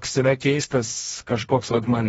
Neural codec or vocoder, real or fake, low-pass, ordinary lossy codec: codec, 24 kHz, 0.9 kbps, WavTokenizer, medium music audio release; fake; 10.8 kHz; AAC, 24 kbps